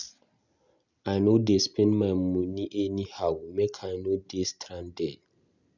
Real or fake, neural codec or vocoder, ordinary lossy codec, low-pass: real; none; none; 7.2 kHz